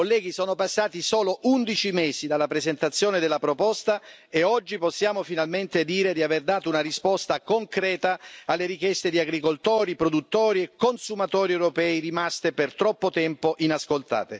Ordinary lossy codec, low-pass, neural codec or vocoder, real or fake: none; none; none; real